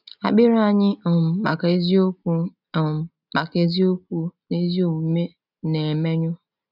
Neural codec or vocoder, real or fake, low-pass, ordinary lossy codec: none; real; 5.4 kHz; none